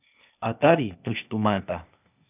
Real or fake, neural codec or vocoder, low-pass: fake; codec, 16 kHz, 0.8 kbps, ZipCodec; 3.6 kHz